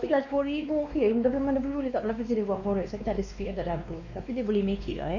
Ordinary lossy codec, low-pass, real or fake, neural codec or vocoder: none; 7.2 kHz; fake; codec, 16 kHz, 2 kbps, X-Codec, WavLM features, trained on Multilingual LibriSpeech